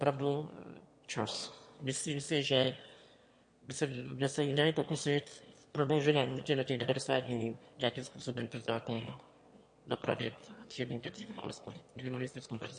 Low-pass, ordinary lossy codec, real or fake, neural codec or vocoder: 9.9 kHz; MP3, 48 kbps; fake; autoencoder, 22.05 kHz, a latent of 192 numbers a frame, VITS, trained on one speaker